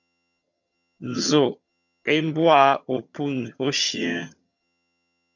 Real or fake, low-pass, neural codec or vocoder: fake; 7.2 kHz; vocoder, 22.05 kHz, 80 mel bands, HiFi-GAN